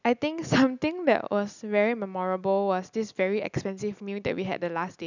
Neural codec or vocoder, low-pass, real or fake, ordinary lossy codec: none; 7.2 kHz; real; none